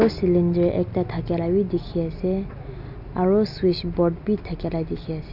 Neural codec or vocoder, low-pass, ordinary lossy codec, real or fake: none; 5.4 kHz; none; real